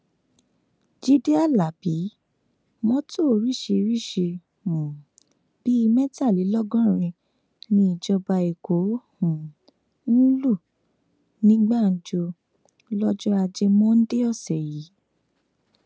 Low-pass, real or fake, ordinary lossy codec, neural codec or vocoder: none; real; none; none